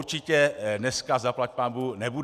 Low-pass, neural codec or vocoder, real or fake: 14.4 kHz; none; real